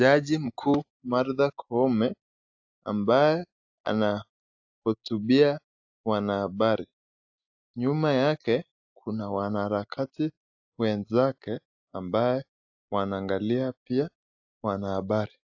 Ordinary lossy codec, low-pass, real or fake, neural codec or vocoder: AAC, 48 kbps; 7.2 kHz; real; none